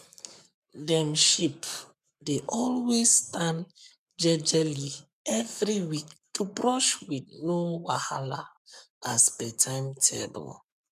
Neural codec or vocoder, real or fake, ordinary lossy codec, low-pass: codec, 44.1 kHz, 7.8 kbps, Pupu-Codec; fake; none; 14.4 kHz